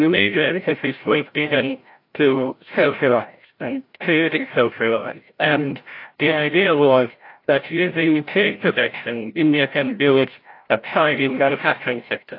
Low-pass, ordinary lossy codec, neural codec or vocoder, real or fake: 5.4 kHz; AAC, 32 kbps; codec, 16 kHz, 0.5 kbps, FreqCodec, larger model; fake